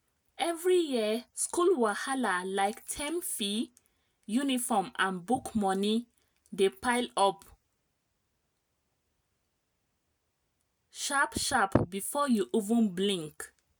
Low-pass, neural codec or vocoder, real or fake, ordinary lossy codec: none; none; real; none